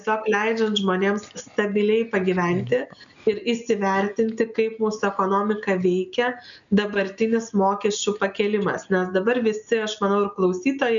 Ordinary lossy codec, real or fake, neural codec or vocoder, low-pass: MP3, 96 kbps; real; none; 7.2 kHz